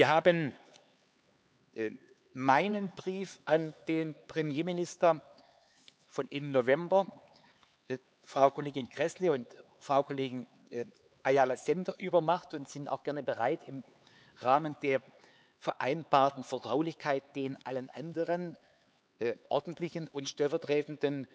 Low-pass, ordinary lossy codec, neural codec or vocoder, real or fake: none; none; codec, 16 kHz, 4 kbps, X-Codec, HuBERT features, trained on LibriSpeech; fake